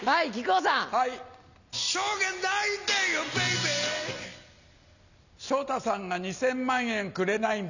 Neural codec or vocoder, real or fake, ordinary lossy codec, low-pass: none; real; none; 7.2 kHz